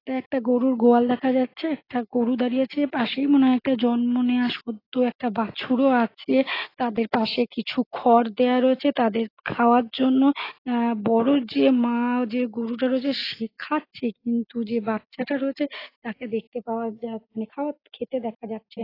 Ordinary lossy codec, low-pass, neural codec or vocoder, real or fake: AAC, 24 kbps; 5.4 kHz; none; real